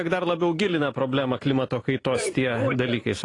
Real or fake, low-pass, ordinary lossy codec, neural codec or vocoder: fake; 10.8 kHz; AAC, 32 kbps; vocoder, 44.1 kHz, 128 mel bands every 512 samples, BigVGAN v2